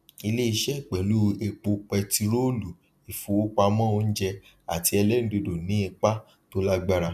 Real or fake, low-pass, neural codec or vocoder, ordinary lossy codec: real; 14.4 kHz; none; none